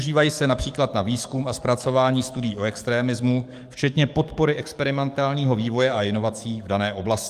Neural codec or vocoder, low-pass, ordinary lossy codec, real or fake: codec, 44.1 kHz, 7.8 kbps, DAC; 14.4 kHz; Opus, 32 kbps; fake